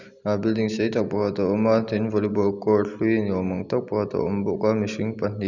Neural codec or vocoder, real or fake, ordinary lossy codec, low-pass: none; real; none; 7.2 kHz